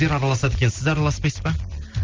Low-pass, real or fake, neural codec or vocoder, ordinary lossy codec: 7.2 kHz; real; none; Opus, 32 kbps